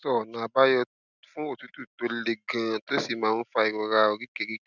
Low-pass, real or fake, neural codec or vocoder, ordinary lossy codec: 7.2 kHz; real; none; none